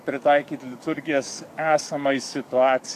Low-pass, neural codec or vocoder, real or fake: 14.4 kHz; codec, 44.1 kHz, 7.8 kbps, DAC; fake